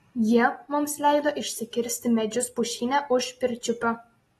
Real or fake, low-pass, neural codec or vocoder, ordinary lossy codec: real; 19.8 kHz; none; AAC, 32 kbps